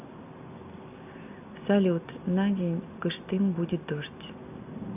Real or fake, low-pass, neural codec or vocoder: real; 3.6 kHz; none